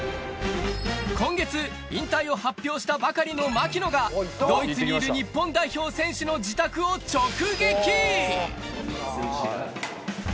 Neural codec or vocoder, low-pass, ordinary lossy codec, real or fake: none; none; none; real